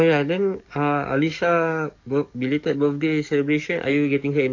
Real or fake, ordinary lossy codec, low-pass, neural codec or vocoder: fake; AAC, 48 kbps; 7.2 kHz; codec, 44.1 kHz, 7.8 kbps, DAC